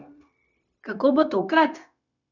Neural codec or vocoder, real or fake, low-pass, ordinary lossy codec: codec, 16 kHz, 0.9 kbps, LongCat-Audio-Codec; fake; 7.2 kHz; none